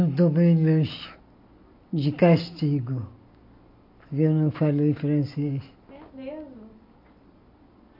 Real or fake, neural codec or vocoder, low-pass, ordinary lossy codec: real; none; 5.4 kHz; MP3, 32 kbps